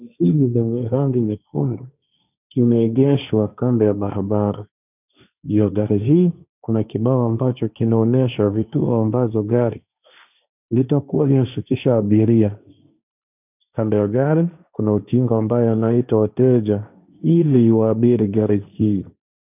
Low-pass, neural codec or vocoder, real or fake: 3.6 kHz; codec, 16 kHz, 1.1 kbps, Voila-Tokenizer; fake